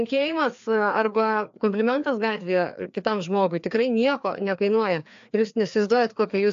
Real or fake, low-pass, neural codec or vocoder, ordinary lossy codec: fake; 7.2 kHz; codec, 16 kHz, 2 kbps, FreqCodec, larger model; AAC, 64 kbps